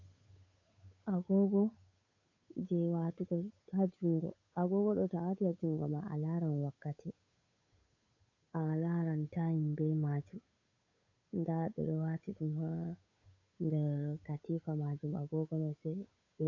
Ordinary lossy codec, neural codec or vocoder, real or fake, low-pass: AAC, 48 kbps; codec, 16 kHz, 8 kbps, FunCodec, trained on Chinese and English, 25 frames a second; fake; 7.2 kHz